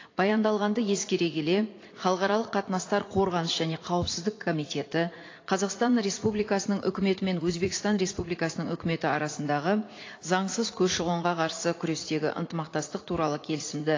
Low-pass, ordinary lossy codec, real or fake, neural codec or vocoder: 7.2 kHz; AAC, 32 kbps; fake; vocoder, 44.1 kHz, 80 mel bands, Vocos